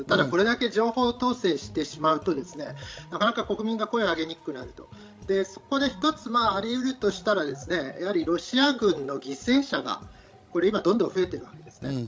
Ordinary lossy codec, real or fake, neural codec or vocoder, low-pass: none; fake; codec, 16 kHz, 16 kbps, FreqCodec, larger model; none